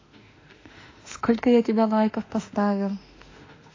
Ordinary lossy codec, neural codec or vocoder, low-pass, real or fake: AAC, 32 kbps; autoencoder, 48 kHz, 32 numbers a frame, DAC-VAE, trained on Japanese speech; 7.2 kHz; fake